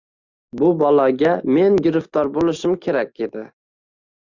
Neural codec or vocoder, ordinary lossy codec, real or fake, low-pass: none; Opus, 64 kbps; real; 7.2 kHz